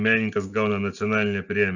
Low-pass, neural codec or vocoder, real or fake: 7.2 kHz; none; real